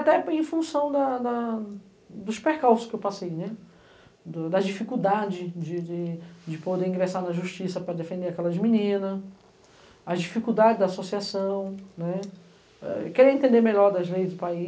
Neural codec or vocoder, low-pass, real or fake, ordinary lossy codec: none; none; real; none